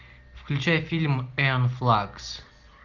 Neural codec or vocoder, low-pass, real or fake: none; 7.2 kHz; real